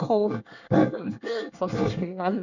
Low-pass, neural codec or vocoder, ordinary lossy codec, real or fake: 7.2 kHz; codec, 24 kHz, 1 kbps, SNAC; none; fake